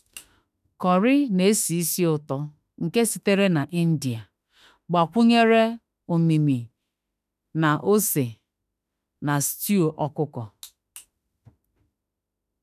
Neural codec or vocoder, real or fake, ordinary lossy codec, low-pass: autoencoder, 48 kHz, 32 numbers a frame, DAC-VAE, trained on Japanese speech; fake; none; 14.4 kHz